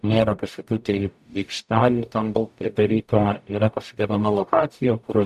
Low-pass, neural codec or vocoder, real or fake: 14.4 kHz; codec, 44.1 kHz, 0.9 kbps, DAC; fake